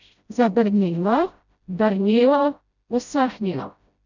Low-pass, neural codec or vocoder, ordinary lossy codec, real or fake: 7.2 kHz; codec, 16 kHz, 0.5 kbps, FreqCodec, smaller model; none; fake